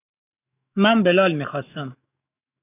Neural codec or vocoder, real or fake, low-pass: autoencoder, 48 kHz, 128 numbers a frame, DAC-VAE, trained on Japanese speech; fake; 3.6 kHz